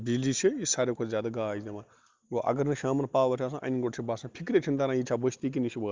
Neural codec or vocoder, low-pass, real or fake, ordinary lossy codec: none; 7.2 kHz; real; Opus, 32 kbps